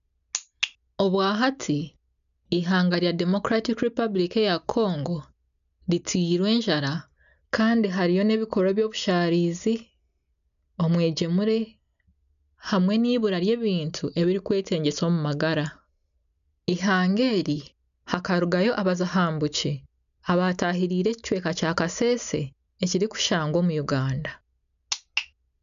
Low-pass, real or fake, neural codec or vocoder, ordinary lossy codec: 7.2 kHz; real; none; none